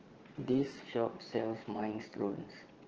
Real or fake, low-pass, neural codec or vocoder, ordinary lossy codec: fake; 7.2 kHz; vocoder, 22.05 kHz, 80 mel bands, Vocos; Opus, 16 kbps